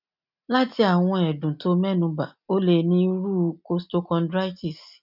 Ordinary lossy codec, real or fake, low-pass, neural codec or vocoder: none; real; 5.4 kHz; none